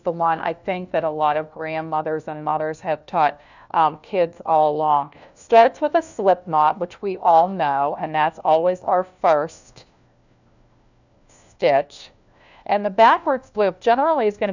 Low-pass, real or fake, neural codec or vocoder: 7.2 kHz; fake; codec, 16 kHz, 1 kbps, FunCodec, trained on LibriTTS, 50 frames a second